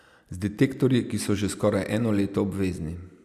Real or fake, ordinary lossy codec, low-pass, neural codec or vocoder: real; none; 14.4 kHz; none